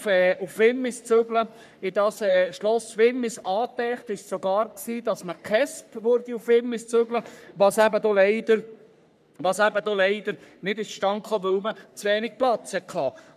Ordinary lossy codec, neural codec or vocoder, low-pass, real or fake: none; codec, 44.1 kHz, 3.4 kbps, Pupu-Codec; 14.4 kHz; fake